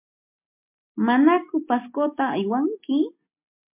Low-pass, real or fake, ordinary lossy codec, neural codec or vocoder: 3.6 kHz; real; MP3, 32 kbps; none